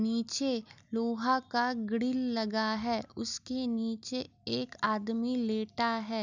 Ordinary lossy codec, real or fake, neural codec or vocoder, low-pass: none; real; none; 7.2 kHz